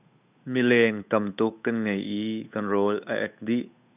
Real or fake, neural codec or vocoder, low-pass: fake; codec, 16 kHz, 8 kbps, FunCodec, trained on Chinese and English, 25 frames a second; 3.6 kHz